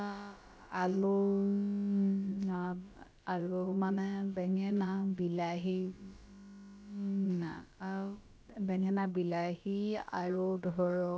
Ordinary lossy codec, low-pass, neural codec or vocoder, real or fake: none; none; codec, 16 kHz, about 1 kbps, DyCAST, with the encoder's durations; fake